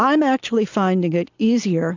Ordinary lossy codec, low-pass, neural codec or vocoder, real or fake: AAC, 48 kbps; 7.2 kHz; none; real